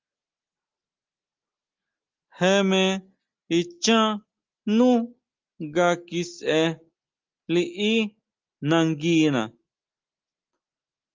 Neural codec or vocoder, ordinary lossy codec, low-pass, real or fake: none; Opus, 32 kbps; 7.2 kHz; real